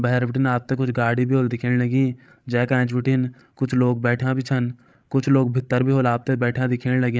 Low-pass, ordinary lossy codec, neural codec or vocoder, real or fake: none; none; codec, 16 kHz, 16 kbps, FreqCodec, larger model; fake